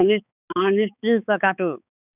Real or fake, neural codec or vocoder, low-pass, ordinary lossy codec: fake; codec, 16 kHz, 4 kbps, X-Codec, HuBERT features, trained on balanced general audio; 3.6 kHz; none